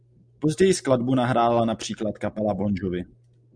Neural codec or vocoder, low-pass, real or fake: none; 9.9 kHz; real